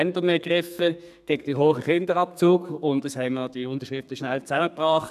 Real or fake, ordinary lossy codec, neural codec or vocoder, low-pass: fake; none; codec, 32 kHz, 1.9 kbps, SNAC; 14.4 kHz